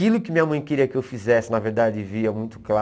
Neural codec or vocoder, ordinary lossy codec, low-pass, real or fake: none; none; none; real